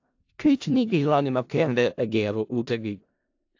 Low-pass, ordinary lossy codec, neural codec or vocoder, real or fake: 7.2 kHz; AAC, 48 kbps; codec, 16 kHz in and 24 kHz out, 0.4 kbps, LongCat-Audio-Codec, four codebook decoder; fake